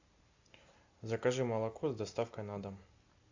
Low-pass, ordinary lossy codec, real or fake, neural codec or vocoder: 7.2 kHz; AAC, 48 kbps; real; none